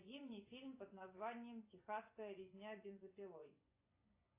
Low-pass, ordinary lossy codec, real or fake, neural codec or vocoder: 3.6 kHz; AAC, 24 kbps; fake; vocoder, 24 kHz, 100 mel bands, Vocos